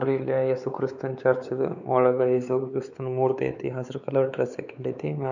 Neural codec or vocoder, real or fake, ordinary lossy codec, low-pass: codec, 16 kHz, 4 kbps, X-Codec, WavLM features, trained on Multilingual LibriSpeech; fake; none; 7.2 kHz